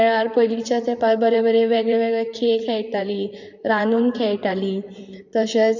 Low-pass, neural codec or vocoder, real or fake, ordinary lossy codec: 7.2 kHz; vocoder, 44.1 kHz, 128 mel bands, Pupu-Vocoder; fake; MP3, 64 kbps